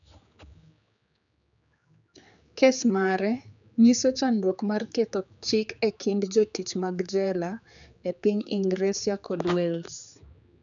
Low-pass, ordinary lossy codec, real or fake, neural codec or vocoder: 7.2 kHz; none; fake; codec, 16 kHz, 4 kbps, X-Codec, HuBERT features, trained on general audio